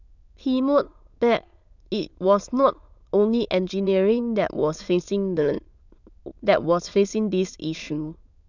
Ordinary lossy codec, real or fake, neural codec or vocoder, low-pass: none; fake; autoencoder, 22.05 kHz, a latent of 192 numbers a frame, VITS, trained on many speakers; 7.2 kHz